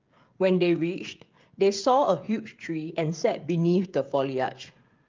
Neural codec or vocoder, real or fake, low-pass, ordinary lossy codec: codec, 16 kHz, 16 kbps, FreqCodec, smaller model; fake; 7.2 kHz; Opus, 32 kbps